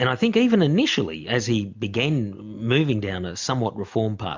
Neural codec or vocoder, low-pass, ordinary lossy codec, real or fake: none; 7.2 kHz; MP3, 64 kbps; real